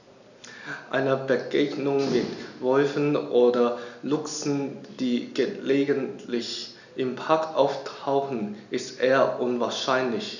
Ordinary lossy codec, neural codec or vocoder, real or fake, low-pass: none; none; real; 7.2 kHz